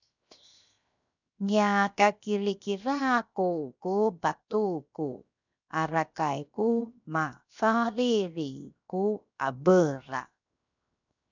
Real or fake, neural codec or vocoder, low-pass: fake; codec, 16 kHz, 0.7 kbps, FocalCodec; 7.2 kHz